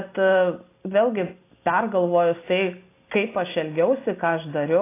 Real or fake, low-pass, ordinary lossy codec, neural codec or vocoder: real; 3.6 kHz; AAC, 24 kbps; none